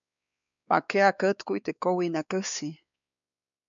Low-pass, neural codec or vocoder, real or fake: 7.2 kHz; codec, 16 kHz, 4 kbps, X-Codec, WavLM features, trained on Multilingual LibriSpeech; fake